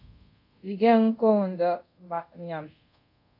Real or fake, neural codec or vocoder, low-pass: fake; codec, 24 kHz, 0.5 kbps, DualCodec; 5.4 kHz